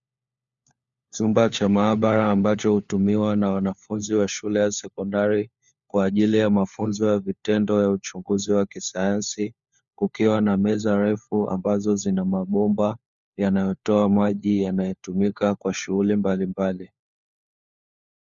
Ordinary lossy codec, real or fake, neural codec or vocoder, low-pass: Opus, 64 kbps; fake; codec, 16 kHz, 4 kbps, FunCodec, trained on LibriTTS, 50 frames a second; 7.2 kHz